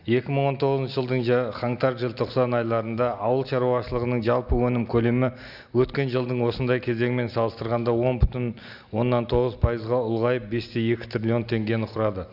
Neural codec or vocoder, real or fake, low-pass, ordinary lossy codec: none; real; 5.4 kHz; none